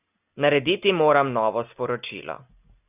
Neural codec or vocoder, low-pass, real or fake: none; 3.6 kHz; real